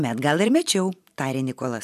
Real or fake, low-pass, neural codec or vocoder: fake; 14.4 kHz; vocoder, 44.1 kHz, 128 mel bands every 256 samples, BigVGAN v2